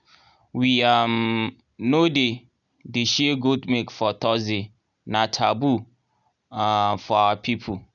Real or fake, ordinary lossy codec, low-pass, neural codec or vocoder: real; none; 7.2 kHz; none